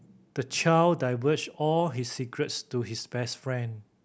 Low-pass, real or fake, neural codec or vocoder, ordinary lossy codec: none; real; none; none